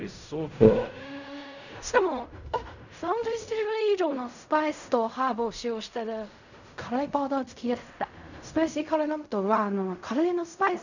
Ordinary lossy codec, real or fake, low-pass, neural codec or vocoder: none; fake; 7.2 kHz; codec, 16 kHz in and 24 kHz out, 0.4 kbps, LongCat-Audio-Codec, fine tuned four codebook decoder